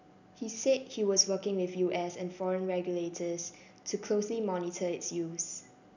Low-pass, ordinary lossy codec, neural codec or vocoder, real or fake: 7.2 kHz; none; none; real